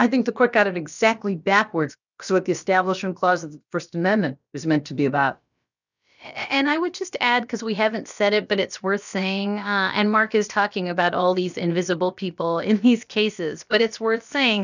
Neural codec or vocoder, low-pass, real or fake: codec, 16 kHz, about 1 kbps, DyCAST, with the encoder's durations; 7.2 kHz; fake